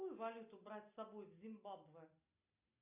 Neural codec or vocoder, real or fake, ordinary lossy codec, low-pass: none; real; AAC, 24 kbps; 3.6 kHz